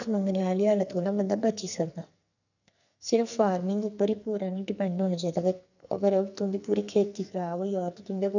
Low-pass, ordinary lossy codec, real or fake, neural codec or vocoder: 7.2 kHz; none; fake; codec, 44.1 kHz, 2.6 kbps, SNAC